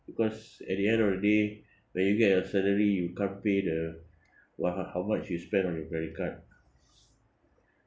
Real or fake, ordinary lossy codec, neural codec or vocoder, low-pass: real; none; none; none